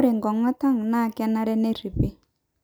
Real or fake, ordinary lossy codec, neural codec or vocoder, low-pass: real; none; none; none